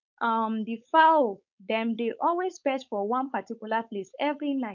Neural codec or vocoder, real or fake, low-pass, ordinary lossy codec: codec, 16 kHz, 4.8 kbps, FACodec; fake; 7.2 kHz; none